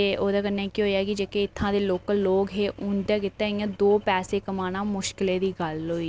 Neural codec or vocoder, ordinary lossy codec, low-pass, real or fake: none; none; none; real